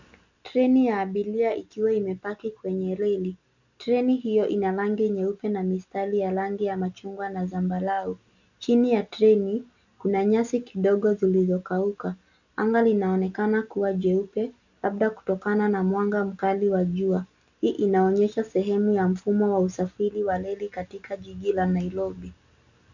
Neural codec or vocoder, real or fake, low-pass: none; real; 7.2 kHz